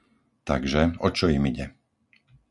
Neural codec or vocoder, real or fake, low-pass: none; real; 10.8 kHz